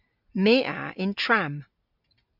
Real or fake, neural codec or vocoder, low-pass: real; none; 5.4 kHz